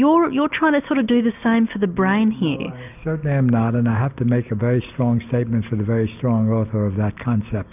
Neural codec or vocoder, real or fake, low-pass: none; real; 3.6 kHz